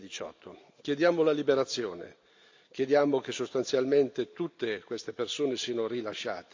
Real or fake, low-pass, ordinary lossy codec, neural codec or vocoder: real; 7.2 kHz; AAC, 48 kbps; none